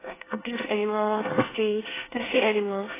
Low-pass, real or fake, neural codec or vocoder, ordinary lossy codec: 3.6 kHz; fake; codec, 24 kHz, 1 kbps, SNAC; AAC, 16 kbps